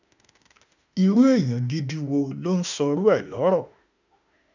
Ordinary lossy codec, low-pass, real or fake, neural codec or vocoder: none; 7.2 kHz; fake; autoencoder, 48 kHz, 32 numbers a frame, DAC-VAE, trained on Japanese speech